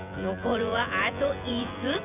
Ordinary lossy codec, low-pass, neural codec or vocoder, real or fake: none; 3.6 kHz; vocoder, 24 kHz, 100 mel bands, Vocos; fake